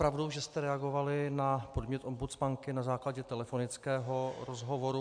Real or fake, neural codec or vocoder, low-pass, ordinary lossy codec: real; none; 9.9 kHz; AAC, 64 kbps